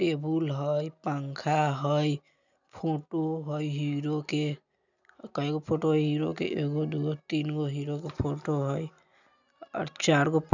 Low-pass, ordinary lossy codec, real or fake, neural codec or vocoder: 7.2 kHz; none; real; none